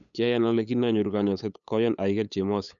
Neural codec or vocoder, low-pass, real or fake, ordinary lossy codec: codec, 16 kHz, 8 kbps, FunCodec, trained on LibriTTS, 25 frames a second; 7.2 kHz; fake; none